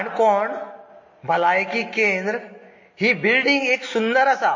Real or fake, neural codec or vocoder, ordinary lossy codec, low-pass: real; none; MP3, 32 kbps; 7.2 kHz